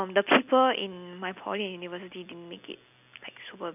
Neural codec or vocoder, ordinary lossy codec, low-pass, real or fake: none; none; 3.6 kHz; real